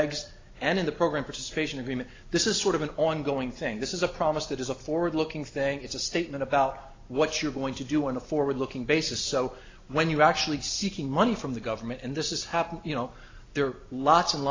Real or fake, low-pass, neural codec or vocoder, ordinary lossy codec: real; 7.2 kHz; none; AAC, 32 kbps